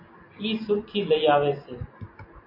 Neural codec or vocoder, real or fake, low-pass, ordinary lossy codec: none; real; 5.4 kHz; MP3, 32 kbps